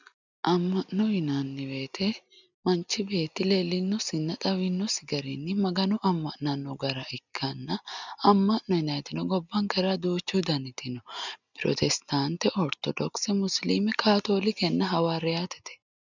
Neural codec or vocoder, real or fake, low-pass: none; real; 7.2 kHz